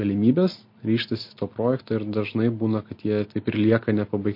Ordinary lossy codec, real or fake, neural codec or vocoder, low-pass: MP3, 32 kbps; real; none; 5.4 kHz